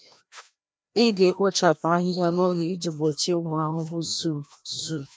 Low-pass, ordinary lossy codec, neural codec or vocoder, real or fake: none; none; codec, 16 kHz, 1 kbps, FreqCodec, larger model; fake